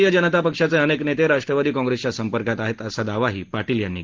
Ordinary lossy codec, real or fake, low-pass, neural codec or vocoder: Opus, 16 kbps; real; 7.2 kHz; none